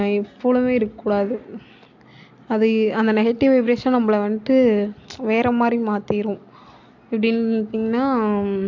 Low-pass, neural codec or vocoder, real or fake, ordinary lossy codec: 7.2 kHz; none; real; AAC, 48 kbps